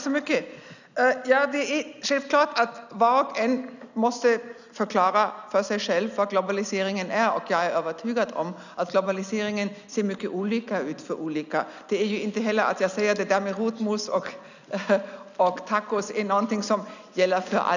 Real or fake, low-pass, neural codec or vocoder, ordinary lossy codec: real; 7.2 kHz; none; none